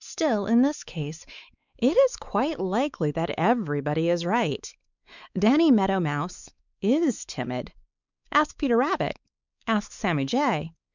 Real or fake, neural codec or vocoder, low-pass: fake; codec, 16 kHz, 4 kbps, X-Codec, WavLM features, trained on Multilingual LibriSpeech; 7.2 kHz